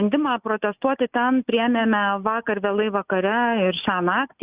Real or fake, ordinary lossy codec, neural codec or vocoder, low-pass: real; Opus, 64 kbps; none; 3.6 kHz